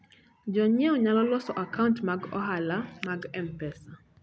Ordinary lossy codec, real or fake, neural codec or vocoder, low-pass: none; real; none; none